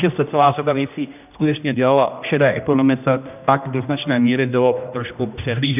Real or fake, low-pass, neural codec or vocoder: fake; 3.6 kHz; codec, 16 kHz, 1 kbps, X-Codec, HuBERT features, trained on general audio